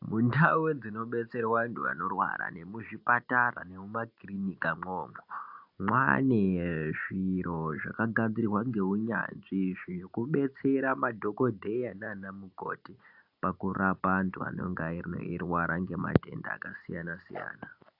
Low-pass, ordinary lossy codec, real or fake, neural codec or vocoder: 5.4 kHz; AAC, 48 kbps; real; none